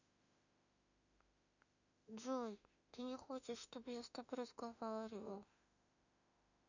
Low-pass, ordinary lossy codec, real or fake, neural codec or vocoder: 7.2 kHz; none; fake; autoencoder, 48 kHz, 32 numbers a frame, DAC-VAE, trained on Japanese speech